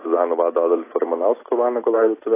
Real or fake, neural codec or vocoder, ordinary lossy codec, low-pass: real; none; AAC, 16 kbps; 3.6 kHz